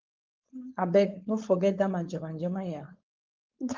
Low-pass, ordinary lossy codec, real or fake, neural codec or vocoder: 7.2 kHz; Opus, 32 kbps; fake; codec, 16 kHz, 4.8 kbps, FACodec